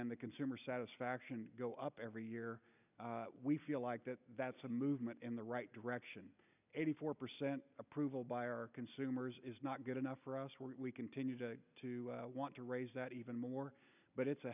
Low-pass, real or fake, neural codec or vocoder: 3.6 kHz; real; none